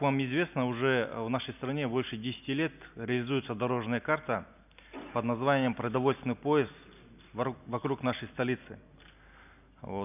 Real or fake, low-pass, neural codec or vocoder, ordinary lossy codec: real; 3.6 kHz; none; none